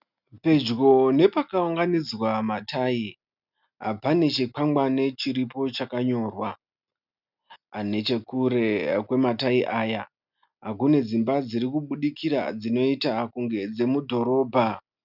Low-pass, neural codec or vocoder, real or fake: 5.4 kHz; none; real